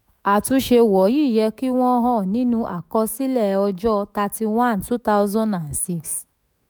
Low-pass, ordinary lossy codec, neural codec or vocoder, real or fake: none; none; autoencoder, 48 kHz, 128 numbers a frame, DAC-VAE, trained on Japanese speech; fake